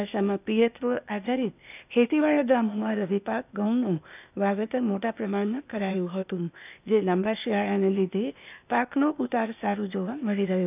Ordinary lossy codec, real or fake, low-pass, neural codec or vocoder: none; fake; 3.6 kHz; codec, 16 kHz, 0.8 kbps, ZipCodec